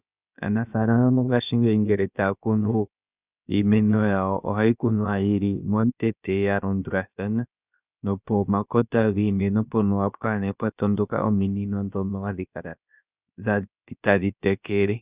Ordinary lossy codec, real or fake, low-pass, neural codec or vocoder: Opus, 64 kbps; fake; 3.6 kHz; codec, 16 kHz, about 1 kbps, DyCAST, with the encoder's durations